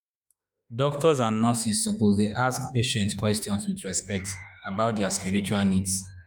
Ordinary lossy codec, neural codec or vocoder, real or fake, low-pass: none; autoencoder, 48 kHz, 32 numbers a frame, DAC-VAE, trained on Japanese speech; fake; none